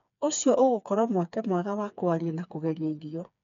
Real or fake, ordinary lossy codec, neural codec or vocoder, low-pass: fake; none; codec, 16 kHz, 4 kbps, FreqCodec, smaller model; 7.2 kHz